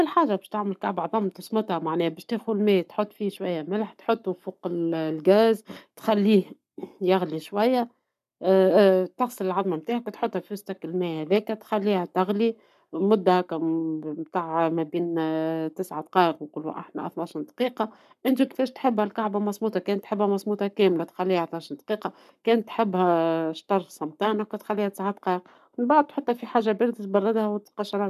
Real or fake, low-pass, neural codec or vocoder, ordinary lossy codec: fake; 14.4 kHz; codec, 44.1 kHz, 7.8 kbps, Pupu-Codec; none